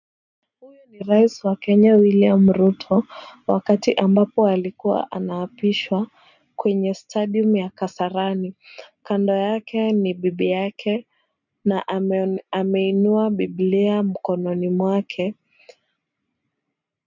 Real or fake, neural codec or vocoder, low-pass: real; none; 7.2 kHz